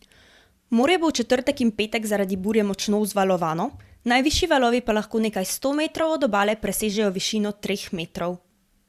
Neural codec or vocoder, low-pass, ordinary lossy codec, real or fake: vocoder, 44.1 kHz, 128 mel bands every 512 samples, BigVGAN v2; 14.4 kHz; Opus, 64 kbps; fake